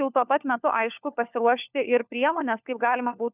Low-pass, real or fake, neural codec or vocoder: 3.6 kHz; fake; codec, 16 kHz, 4 kbps, FunCodec, trained on LibriTTS, 50 frames a second